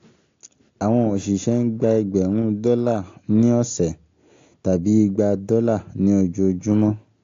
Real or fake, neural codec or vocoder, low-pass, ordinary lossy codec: real; none; 7.2 kHz; AAC, 48 kbps